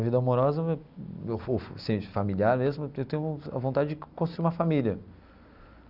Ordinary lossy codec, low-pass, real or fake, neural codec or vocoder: Opus, 64 kbps; 5.4 kHz; real; none